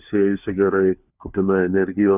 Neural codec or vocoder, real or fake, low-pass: codec, 16 kHz, 4 kbps, FunCodec, trained on LibriTTS, 50 frames a second; fake; 3.6 kHz